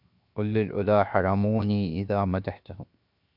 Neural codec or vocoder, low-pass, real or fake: codec, 16 kHz, 0.7 kbps, FocalCodec; 5.4 kHz; fake